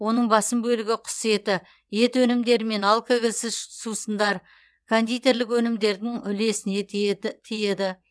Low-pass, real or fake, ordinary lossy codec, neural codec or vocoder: none; fake; none; vocoder, 22.05 kHz, 80 mel bands, WaveNeXt